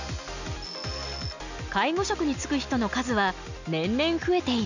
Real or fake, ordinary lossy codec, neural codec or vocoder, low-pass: real; none; none; 7.2 kHz